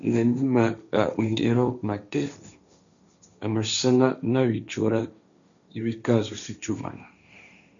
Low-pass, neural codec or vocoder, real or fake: 7.2 kHz; codec, 16 kHz, 1.1 kbps, Voila-Tokenizer; fake